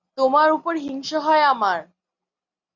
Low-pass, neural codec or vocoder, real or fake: 7.2 kHz; none; real